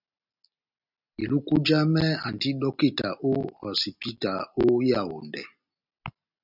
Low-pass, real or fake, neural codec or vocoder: 5.4 kHz; real; none